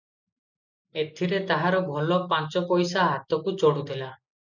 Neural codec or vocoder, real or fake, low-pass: none; real; 7.2 kHz